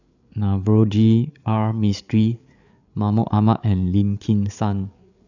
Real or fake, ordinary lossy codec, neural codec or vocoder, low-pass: fake; none; codec, 16 kHz, 8 kbps, FreqCodec, larger model; 7.2 kHz